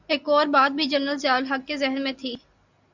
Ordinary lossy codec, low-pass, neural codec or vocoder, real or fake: MP3, 64 kbps; 7.2 kHz; none; real